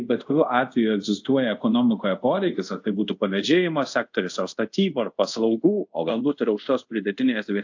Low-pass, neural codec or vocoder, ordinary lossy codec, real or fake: 7.2 kHz; codec, 24 kHz, 0.5 kbps, DualCodec; AAC, 48 kbps; fake